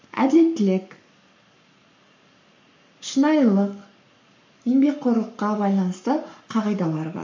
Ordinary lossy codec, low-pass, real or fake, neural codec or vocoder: MP3, 48 kbps; 7.2 kHz; fake; codec, 44.1 kHz, 7.8 kbps, Pupu-Codec